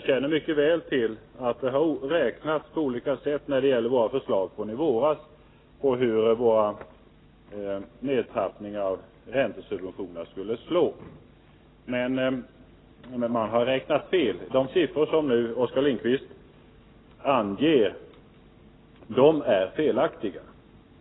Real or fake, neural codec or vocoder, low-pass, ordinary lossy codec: real; none; 7.2 kHz; AAC, 16 kbps